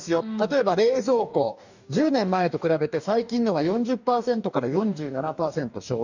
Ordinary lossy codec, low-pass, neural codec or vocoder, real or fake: none; 7.2 kHz; codec, 44.1 kHz, 2.6 kbps, DAC; fake